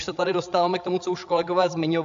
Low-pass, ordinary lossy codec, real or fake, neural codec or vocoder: 7.2 kHz; AAC, 64 kbps; fake; codec, 16 kHz, 16 kbps, FreqCodec, larger model